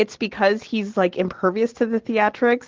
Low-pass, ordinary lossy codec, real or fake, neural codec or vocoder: 7.2 kHz; Opus, 16 kbps; real; none